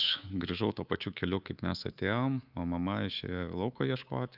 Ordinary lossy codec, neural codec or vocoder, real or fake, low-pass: Opus, 24 kbps; codec, 24 kHz, 3.1 kbps, DualCodec; fake; 5.4 kHz